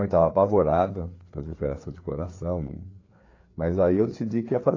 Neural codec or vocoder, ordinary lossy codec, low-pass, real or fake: codec, 16 kHz, 4 kbps, FreqCodec, larger model; AAC, 32 kbps; 7.2 kHz; fake